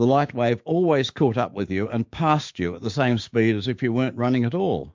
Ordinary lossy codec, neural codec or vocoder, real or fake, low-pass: MP3, 48 kbps; codec, 16 kHz, 6 kbps, DAC; fake; 7.2 kHz